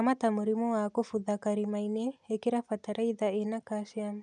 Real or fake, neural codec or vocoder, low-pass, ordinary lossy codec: real; none; 10.8 kHz; none